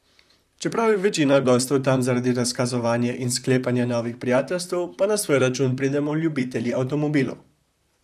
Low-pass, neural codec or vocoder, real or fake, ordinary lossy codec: 14.4 kHz; vocoder, 44.1 kHz, 128 mel bands, Pupu-Vocoder; fake; none